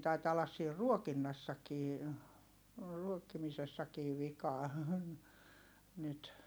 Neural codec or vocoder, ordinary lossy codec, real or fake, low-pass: none; none; real; none